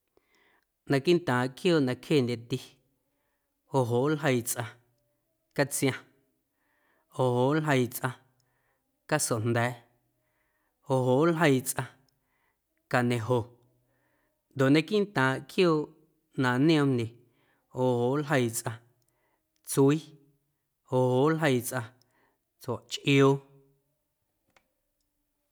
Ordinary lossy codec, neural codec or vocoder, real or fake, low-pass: none; none; real; none